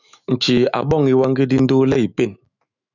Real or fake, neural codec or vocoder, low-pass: fake; autoencoder, 48 kHz, 128 numbers a frame, DAC-VAE, trained on Japanese speech; 7.2 kHz